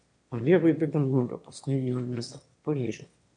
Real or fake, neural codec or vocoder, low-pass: fake; autoencoder, 22.05 kHz, a latent of 192 numbers a frame, VITS, trained on one speaker; 9.9 kHz